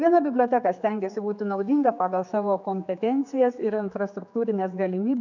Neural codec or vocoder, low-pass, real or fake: codec, 16 kHz, 4 kbps, X-Codec, HuBERT features, trained on general audio; 7.2 kHz; fake